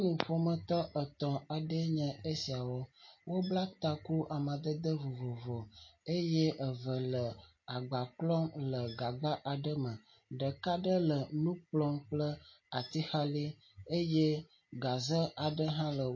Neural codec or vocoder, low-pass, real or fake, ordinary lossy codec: none; 7.2 kHz; real; MP3, 32 kbps